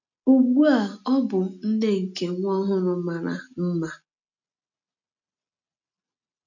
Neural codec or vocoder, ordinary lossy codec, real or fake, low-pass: none; none; real; 7.2 kHz